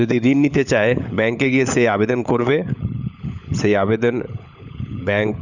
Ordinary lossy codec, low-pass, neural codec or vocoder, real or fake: none; 7.2 kHz; codec, 16 kHz, 16 kbps, FunCodec, trained on LibriTTS, 50 frames a second; fake